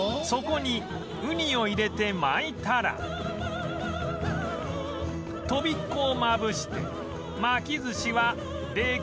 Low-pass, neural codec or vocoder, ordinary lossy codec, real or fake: none; none; none; real